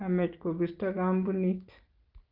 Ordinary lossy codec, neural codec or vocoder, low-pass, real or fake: Opus, 32 kbps; none; 5.4 kHz; real